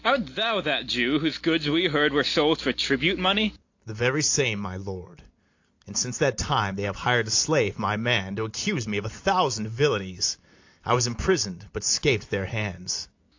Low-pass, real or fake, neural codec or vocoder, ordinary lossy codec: 7.2 kHz; real; none; AAC, 48 kbps